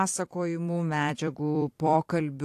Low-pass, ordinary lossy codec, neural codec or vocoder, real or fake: 14.4 kHz; AAC, 64 kbps; vocoder, 44.1 kHz, 128 mel bands every 256 samples, BigVGAN v2; fake